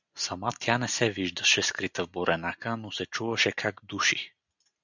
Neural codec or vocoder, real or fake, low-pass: none; real; 7.2 kHz